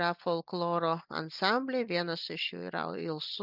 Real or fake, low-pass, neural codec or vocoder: real; 5.4 kHz; none